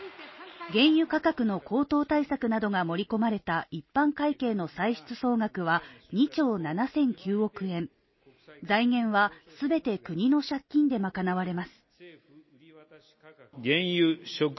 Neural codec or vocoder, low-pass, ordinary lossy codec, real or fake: none; 7.2 kHz; MP3, 24 kbps; real